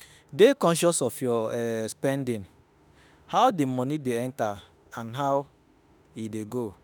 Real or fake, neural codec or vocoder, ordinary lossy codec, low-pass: fake; autoencoder, 48 kHz, 32 numbers a frame, DAC-VAE, trained on Japanese speech; none; none